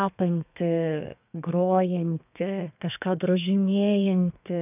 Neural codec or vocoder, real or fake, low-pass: codec, 32 kHz, 1.9 kbps, SNAC; fake; 3.6 kHz